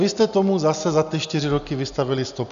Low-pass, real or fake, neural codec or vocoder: 7.2 kHz; real; none